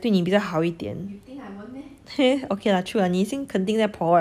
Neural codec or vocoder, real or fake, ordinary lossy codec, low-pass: none; real; none; 14.4 kHz